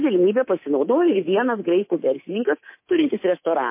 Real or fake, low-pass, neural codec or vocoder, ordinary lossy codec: fake; 3.6 kHz; codec, 24 kHz, 3.1 kbps, DualCodec; MP3, 24 kbps